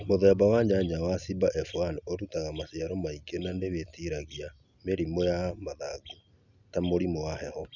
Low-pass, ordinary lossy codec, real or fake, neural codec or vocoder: 7.2 kHz; none; real; none